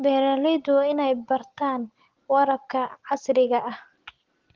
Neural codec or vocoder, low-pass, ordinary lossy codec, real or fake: none; 7.2 kHz; Opus, 16 kbps; real